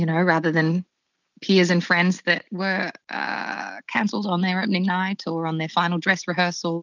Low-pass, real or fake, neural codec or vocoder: 7.2 kHz; real; none